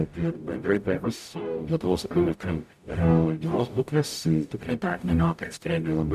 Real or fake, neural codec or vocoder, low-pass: fake; codec, 44.1 kHz, 0.9 kbps, DAC; 14.4 kHz